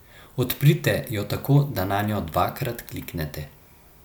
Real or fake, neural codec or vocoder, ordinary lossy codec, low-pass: real; none; none; none